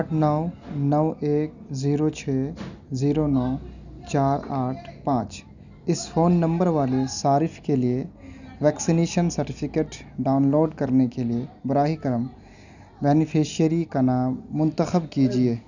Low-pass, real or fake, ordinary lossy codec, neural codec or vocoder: 7.2 kHz; real; none; none